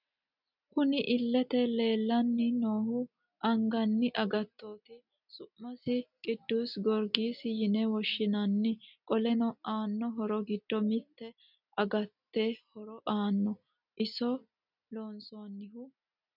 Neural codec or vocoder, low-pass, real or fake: none; 5.4 kHz; real